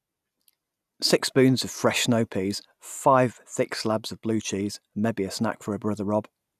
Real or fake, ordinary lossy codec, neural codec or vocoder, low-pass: real; none; none; 14.4 kHz